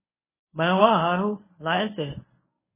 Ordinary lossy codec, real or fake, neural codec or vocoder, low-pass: MP3, 16 kbps; fake; codec, 24 kHz, 0.9 kbps, WavTokenizer, medium speech release version 1; 3.6 kHz